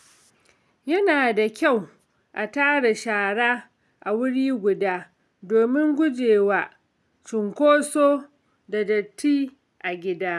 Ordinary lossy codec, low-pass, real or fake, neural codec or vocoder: none; none; real; none